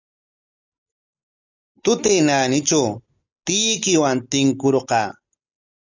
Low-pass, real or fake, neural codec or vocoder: 7.2 kHz; real; none